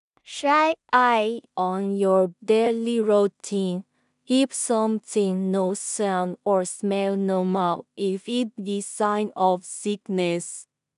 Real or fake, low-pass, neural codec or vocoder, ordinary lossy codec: fake; 10.8 kHz; codec, 16 kHz in and 24 kHz out, 0.4 kbps, LongCat-Audio-Codec, two codebook decoder; none